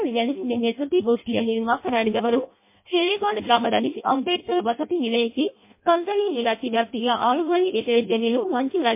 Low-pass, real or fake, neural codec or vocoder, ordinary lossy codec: 3.6 kHz; fake; codec, 16 kHz in and 24 kHz out, 0.6 kbps, FireRedTTS-2 codec; MP3, 24 kbps